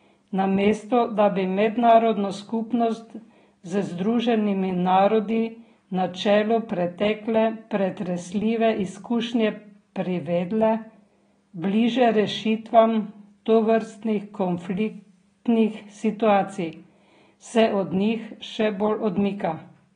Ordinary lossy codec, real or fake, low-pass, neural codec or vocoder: AAC, 32 kbps; real; 9.9 kHz; none